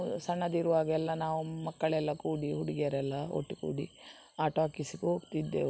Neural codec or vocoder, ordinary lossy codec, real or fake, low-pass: none; none; real; none